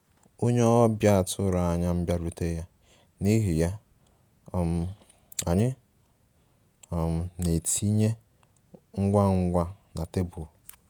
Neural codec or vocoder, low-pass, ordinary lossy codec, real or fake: vocoder, 48 kHz, 128 mel bands, Vocos; none; none; fake